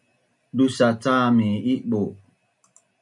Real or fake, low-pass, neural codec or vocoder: real; 10.8 kHz; none